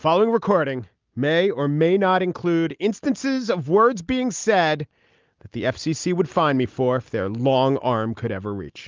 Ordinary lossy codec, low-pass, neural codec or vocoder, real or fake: Opus, 24 kbps; 7.2 kHz; none; real